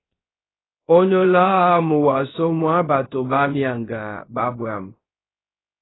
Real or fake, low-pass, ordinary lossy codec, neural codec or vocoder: fake; 7.2 kHz; AAC, 16 kbps; codec, 16 kHz, 0.3 kbps, FocalCodec